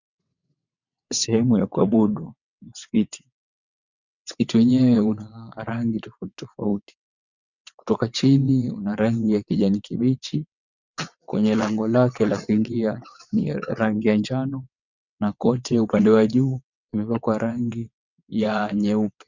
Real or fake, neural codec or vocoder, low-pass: fake; vocoder, 22.05 kHz, 80 mel bands, WaveNeXt; 7.2 kHz